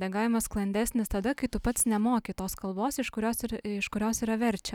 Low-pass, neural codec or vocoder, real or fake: 19.8 kHz; none; real